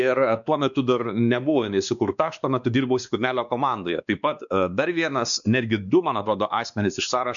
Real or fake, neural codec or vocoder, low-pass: fake; codec, 16 kHz, 2 kbps, X-Codec, WavLM features, trained on Multilingual LibriSpeech; 7.2 kHz